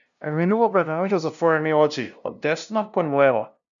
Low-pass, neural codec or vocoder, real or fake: 7.2 kHz; codec, 16 kHz, 0.5 kbps, FunCodec, trained on LibriTTS, 25 frames a second; fake